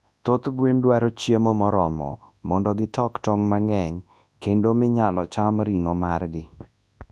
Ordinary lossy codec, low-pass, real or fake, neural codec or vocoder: none; none; fake; codec, 24 kHz, 0.9 kbps, WavTokenizer, large speech release